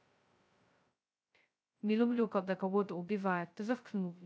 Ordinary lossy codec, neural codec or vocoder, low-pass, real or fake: none; codec, 16 kHz, 0.2 kbps, FocalCodec; none; fake